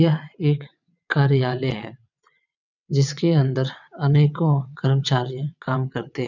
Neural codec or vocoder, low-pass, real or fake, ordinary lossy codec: vocoder, 22.05 kHz, 80 mel bands, WaveNeXt; 7.2 kHz; fake; none